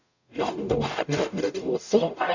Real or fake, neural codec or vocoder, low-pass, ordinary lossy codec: fake; codec, 44.1 kHz, 0.9 kbps, DAC; 7.2 kHz; none